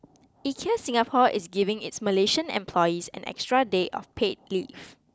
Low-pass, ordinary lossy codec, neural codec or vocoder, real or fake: none; none; none; real